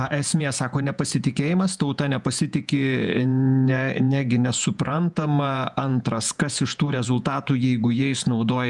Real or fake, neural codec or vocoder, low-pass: fake; vocoder, 48 kHz, 128 mel bands, Vocos; 10.8 kHz